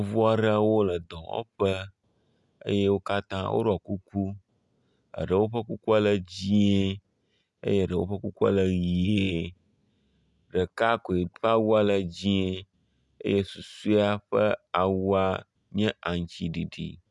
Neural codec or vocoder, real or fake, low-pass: none; real; 10.8 kHz